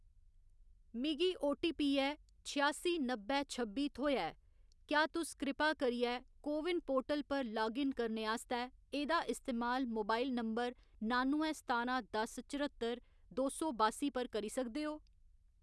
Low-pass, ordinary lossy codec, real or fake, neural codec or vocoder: none; none; real; none